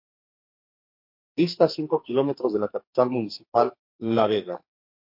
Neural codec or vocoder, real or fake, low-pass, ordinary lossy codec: codec, 44.1 kHz, 2.6 kbps, SNAC; fake; 5.4 kHz; MP3, 32 kbps